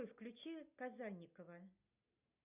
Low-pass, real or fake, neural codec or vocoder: 3.6 kHz; real; none